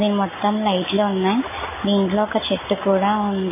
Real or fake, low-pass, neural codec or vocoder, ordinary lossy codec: real; 3.6 kHz; none; MP3, 16 kbps